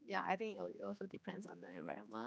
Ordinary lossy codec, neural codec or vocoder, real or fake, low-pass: none; codec, 16 kHz, 2 kbps, X-Codec, HuBERT features, trained on general audio; fake; none